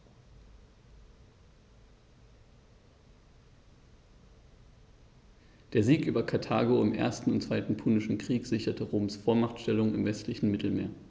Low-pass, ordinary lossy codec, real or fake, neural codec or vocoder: none; none; real; none